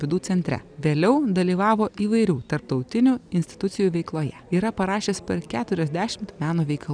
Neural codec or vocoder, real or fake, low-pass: none; real; 9.9 kHz